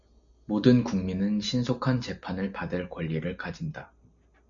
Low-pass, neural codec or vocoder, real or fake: 7.2 kHz; none; real